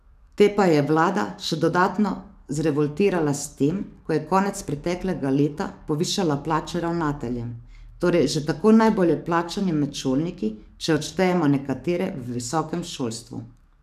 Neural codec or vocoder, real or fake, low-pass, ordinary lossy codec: codec, 44.1 kHz, 7.8 kbps, DAC; fake; 14.4 kHz; none